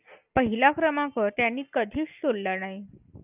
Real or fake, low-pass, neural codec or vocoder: real; 3.6 kHz; none